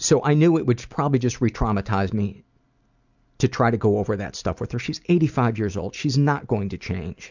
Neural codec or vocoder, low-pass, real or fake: none; 7.2 kHz; real